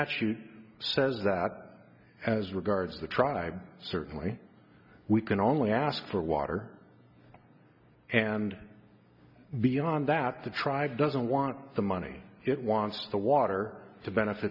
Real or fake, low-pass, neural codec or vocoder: real; 5.4 kHz; none